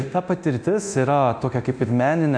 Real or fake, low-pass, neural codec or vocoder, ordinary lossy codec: fake; 9.9 kHz; codec, 24 kHz, 0.9 kbps, DualCodec; MP3, 96 kbps